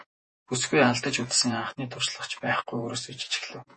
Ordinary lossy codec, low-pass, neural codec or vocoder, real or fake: MP3, 32 kbps; 10.8 kHz; vocoder, 48 kHz, 128 mel bands, Vocos; fake